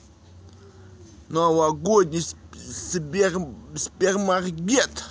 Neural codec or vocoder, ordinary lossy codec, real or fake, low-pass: none; none; real; none